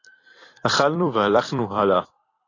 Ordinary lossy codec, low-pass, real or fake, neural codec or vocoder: AAC, 32 kbps; 7.2 kHz; fake; vocoder, 44.1 kHz, 128 mel bands every 256 samples, BigVGAN v2